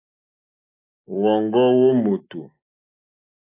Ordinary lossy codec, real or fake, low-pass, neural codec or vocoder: AAC, 24 kbps; real; 3.6 kHz; none